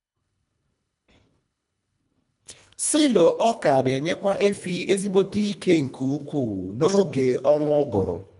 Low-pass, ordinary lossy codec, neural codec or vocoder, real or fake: 10.8 kHz; none; codec, 24 kHz, 1.5 kbps, HILCodec; fake